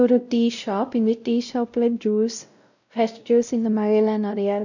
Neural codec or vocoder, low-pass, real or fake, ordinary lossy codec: codec, 16 kHz, 0.5 kbps, X-Codec, WavLM features, trained on Multilingual LibriSpeech; 7.2 kHz; fake; none